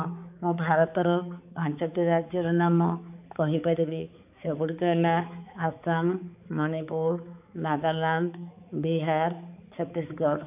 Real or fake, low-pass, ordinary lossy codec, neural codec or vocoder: fake; 3.6 kHz; AAC, 32 kbps; codec, 16 kHz, 4 kbps, X-Codec, HuBERT features, trained on balanced general audio